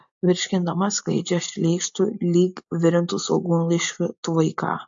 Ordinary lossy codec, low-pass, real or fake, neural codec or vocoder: AAC, 64 kbps; 7.2 kHz; real; none